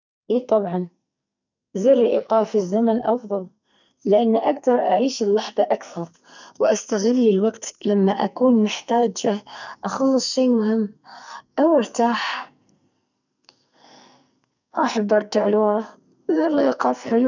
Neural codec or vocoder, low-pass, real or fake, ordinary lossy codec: codec, 44.1 kHz, 2.6 kbps, SNAC; 7.2 kHz; fake; none